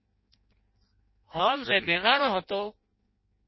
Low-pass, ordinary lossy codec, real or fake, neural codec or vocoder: 7.2 kHz; MP3, 24 kbps; fake; codec, 16 kHz in and 24 kHz out, 0.6 kbps, FireRedTTS-2 codec